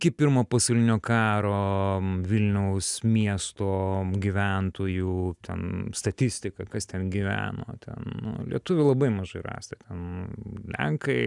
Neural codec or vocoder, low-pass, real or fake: none; 10.8 kHz; real